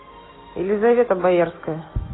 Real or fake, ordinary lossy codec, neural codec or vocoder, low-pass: real; AAC, 16 kbps; none; 7.2 kHz